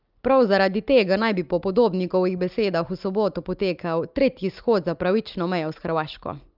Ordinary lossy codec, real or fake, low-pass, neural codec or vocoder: Opus, 24 kbps; real; 5.4 kHz; none